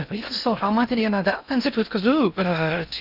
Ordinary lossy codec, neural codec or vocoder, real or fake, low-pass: none; codec, 16 kHz in and 24 kHz out, 0.6 kbps, FocalCodec, streaming, 2048 codes; fake; 5.4 kHz